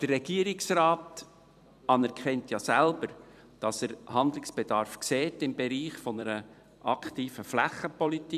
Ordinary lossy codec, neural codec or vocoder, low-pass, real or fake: none; vocoder, 44.1 kHz, 128 mel bands every 256 samples, BigVGAN v2; 14.4 kHz; fake